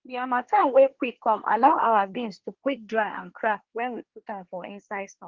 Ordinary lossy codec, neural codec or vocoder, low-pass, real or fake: Opus, 16 kbps; codec, 24 kHz, 1 kbps, SNAC; 7.2 kHz; fake